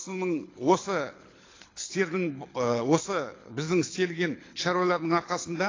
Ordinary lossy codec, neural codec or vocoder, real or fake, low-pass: AAC, 32 kbps; codec, 24 kHz, 6 kbps, HILCodec; fake; 7.2 kHz